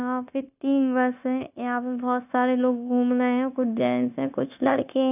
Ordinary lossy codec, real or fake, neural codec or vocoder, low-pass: none; fake; codec, 16 kHz, 0.9 kbps, LongCat-Audio-Codec; 3.6 kHz